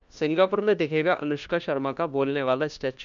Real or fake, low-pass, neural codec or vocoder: fake; 7.2 kHz; codec, 16 kHz, 1 kbps, FunCodec, trained on LibriTTS, 50 frames a second